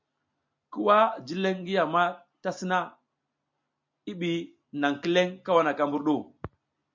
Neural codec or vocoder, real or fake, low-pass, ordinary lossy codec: none; real; 7.2 kHz; MP3, 64 kbps